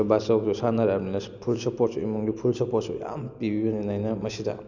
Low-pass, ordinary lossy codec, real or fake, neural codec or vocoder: 7.2 kHz; none; real; none